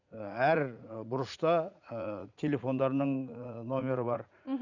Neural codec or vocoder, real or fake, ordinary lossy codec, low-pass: vocoder, 44.1 kHz, 80 mel bands, Vocos; fake; none; 7.2 kHz